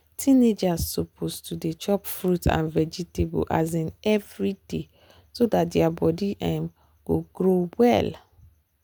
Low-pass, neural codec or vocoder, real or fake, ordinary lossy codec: none; none; real; none